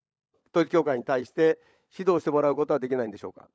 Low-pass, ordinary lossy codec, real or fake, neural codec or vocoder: none; none; fake; codec, 16 kHz, 16 kbps, FunCodec, trained on LibriTTS, 50 frames a second